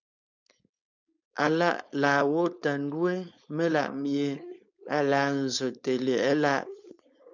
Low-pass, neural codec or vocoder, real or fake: 7.2 kHz; codec, 16 kHz, 4.8 kbps, FACodec; fake